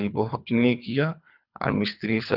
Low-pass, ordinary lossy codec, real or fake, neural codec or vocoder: 5.4 kHz; none; fake; codec, 24 kHz, 3 kbps, HILCodec